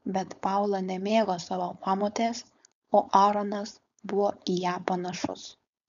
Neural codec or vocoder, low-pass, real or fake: codec, 16 kHz, 4.8 kbps, FACodec; 7.2 kHz; fake